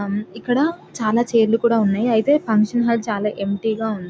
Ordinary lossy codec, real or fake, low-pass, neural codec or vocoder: none; real; none; none